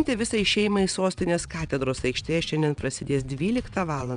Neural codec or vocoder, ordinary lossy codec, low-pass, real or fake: vocoder, 22.05 kHz, 80 mel bands, WaveNeXt; MP3, 96 kbps; 9.9 kHz; fake